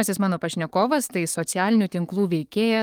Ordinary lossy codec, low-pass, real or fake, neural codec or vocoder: Opus, 32 kbps; 19.8 kHz; fake; codec, 44.1 kHz, 7.8 kbps, DAC